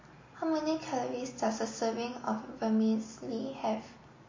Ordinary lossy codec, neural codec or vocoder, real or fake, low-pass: MP3, 32 kbps; none; real; 7.2 kHz